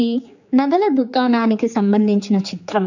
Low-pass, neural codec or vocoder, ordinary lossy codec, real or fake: 7.2 kHz; codec, 16 kHz, 4 kbps, X-Codec, HuBERT features, trained on general audio; none; fake